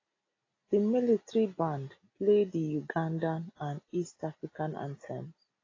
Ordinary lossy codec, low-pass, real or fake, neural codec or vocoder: AAC, 32 kbps; 7.2 kHz; real; none